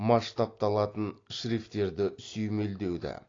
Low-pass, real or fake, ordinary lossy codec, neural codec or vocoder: 7.2 kHz; real; AAC, 32 kbps; none